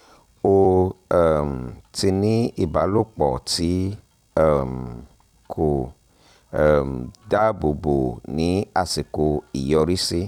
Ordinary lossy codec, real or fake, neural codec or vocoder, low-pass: none; fake; vocoder, 44.1 kHz, 128 mel bands every 256 samples, BigVGAN v2; 19.8 kHz